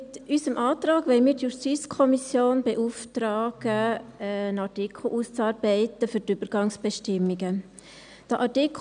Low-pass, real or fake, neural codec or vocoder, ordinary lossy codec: 9.9 kHz; real; none; none